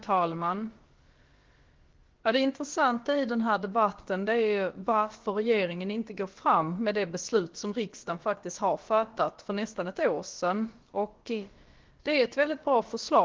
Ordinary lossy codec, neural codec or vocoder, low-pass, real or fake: Opus, 16 kbps; codec, 16 kHz, about 1 kbps, DyCAST, with the encoder's durations; 7.2 kHz; fake